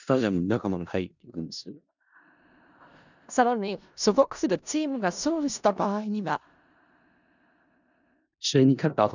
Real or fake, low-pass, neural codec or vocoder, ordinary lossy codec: fake; 7.2 kHz; codec, 16 kHz in and 24 kHz out, 0.4 kbps, LongCat-Audio-Codec, four codebook decoder; none